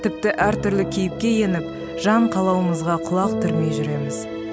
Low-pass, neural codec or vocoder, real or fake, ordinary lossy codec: none; none; real; none